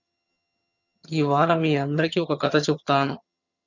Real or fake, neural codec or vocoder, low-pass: fake; vocoder, 22.05 kHz, 80 mel bands, HiFi-GAN; 7.2 kHz